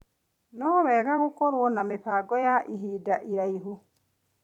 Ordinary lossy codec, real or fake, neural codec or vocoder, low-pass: none; fake; vocoder, 44.1 kHz, 128 mel bands every 256 samples, BigVGAN v2; 19.8 kHz